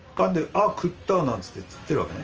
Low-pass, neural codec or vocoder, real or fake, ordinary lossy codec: 7.2 kHz; vocoder, 44.1 kHz, 128 mel bands every 512 samples, BigVGAN v2; fake; Opus, 24 kbps